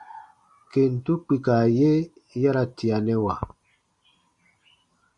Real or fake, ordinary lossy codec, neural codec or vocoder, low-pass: real; Opus, 64 kbps; none; 10.8 kHz